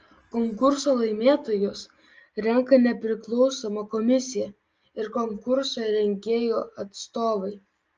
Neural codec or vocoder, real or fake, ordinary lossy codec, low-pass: none; real; Opus, 24 kbps; 7.2 kHz